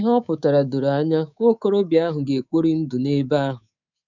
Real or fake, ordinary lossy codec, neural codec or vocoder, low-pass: fake; none; codec, 24 kHz, 3.1 kbps, DualCodec; 7.2 kHz